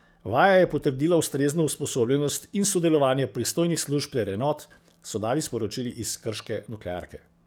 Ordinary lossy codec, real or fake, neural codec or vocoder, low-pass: none; fake; codec, 44.1 kHz, 7.8 kbps, DAC; none